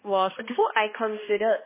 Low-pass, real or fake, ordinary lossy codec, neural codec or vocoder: 3.6 kHz; fake; MP3, 16 kbps; codec, 16 kHz, 1 kbps, X-Codec, HuBERT features, trained on balanced general audio